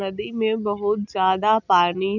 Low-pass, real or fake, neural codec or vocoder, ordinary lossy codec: 7.2 kHz; real; none; none